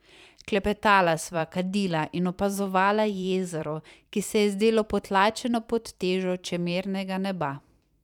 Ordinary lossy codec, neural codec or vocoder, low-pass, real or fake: none; vocoder, 44.1 kHz, 128 mel bands every 512 samples, BigVGAN v2; 19.8 kHz; fake